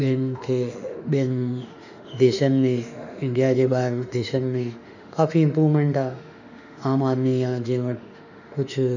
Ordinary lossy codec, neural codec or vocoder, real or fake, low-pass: none; autoencoder, 48 kHz, 32 numbers a frame, DAC-VAE, trained on Japanese speech; fake; 7.2 kHz